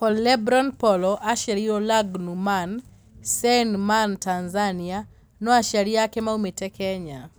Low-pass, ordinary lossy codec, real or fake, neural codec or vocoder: none; none; real; none